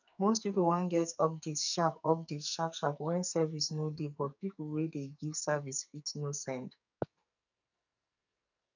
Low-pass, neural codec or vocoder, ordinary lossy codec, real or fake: 7.2 kHz; codec, 44.1 kHz, 2.6 kbps, SNAC; none; fake